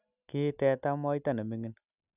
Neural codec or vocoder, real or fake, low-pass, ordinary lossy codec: none; real; 3.6 kHz; none